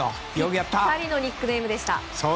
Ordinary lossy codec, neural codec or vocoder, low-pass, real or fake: none; none; none; real